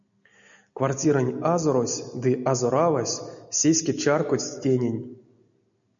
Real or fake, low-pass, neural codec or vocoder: real; 7.2 kHz; none